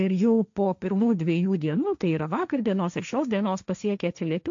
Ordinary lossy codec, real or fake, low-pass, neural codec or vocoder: AAC, 64 kbps; fake; 7.2 kHz; codec, 16 kHz, 1.1 kbps, Voila-Tokenizer